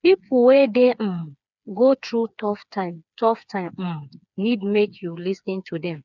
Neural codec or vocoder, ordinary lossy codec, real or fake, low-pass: codec, 16 kHz, 4 kbps, FreqCodec, smaller model; none; fake; 7.2 kHz